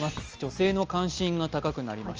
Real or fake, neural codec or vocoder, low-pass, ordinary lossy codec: real; none; 7.2 kHz; Opus, 24 kbps